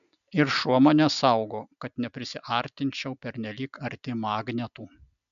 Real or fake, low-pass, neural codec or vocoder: real; 7.2 kHz; none